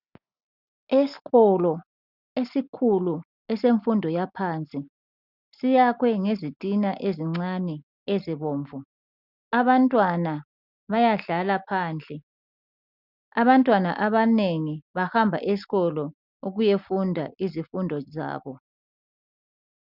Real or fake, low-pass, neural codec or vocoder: real; 5.4 kHz; none